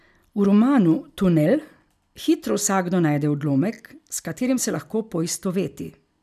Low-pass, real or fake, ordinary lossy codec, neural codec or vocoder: 14.4 kHz; real; none; none